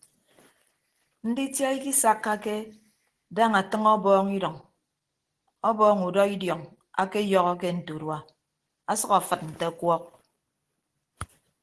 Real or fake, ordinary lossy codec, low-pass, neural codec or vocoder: real; Opus, 16 kbps; 10.8 kHz; none